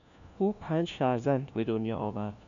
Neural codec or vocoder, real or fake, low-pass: codec, 16 kHz, 1 kbps, FunCodec, trained on LibriTTS, 50 frames a second; fake; 7.2 kHz